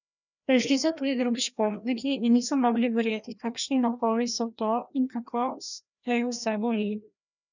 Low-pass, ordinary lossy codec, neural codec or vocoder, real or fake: 7.2 kHz; none; codec, 16 kHz, 1 kbps, FreqCodec, larger model; fake